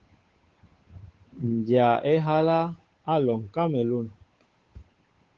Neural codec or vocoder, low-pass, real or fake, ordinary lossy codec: codec, 16 kHz, 8 kbps, FunCodec, trained on Chinese and English, 25 frames a second; 7.2 kHz; fake; Opus, 16 kbps